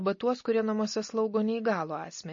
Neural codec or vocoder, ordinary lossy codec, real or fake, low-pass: none; MP3, 32 kbps; real; 7.2 kHz